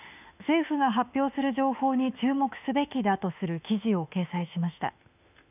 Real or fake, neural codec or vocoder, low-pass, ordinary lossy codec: fake; autoencoder, 48 kHz, 32 numbers a frame, DAC-VAE, trained on Japanese speech; 3.6 kHz; none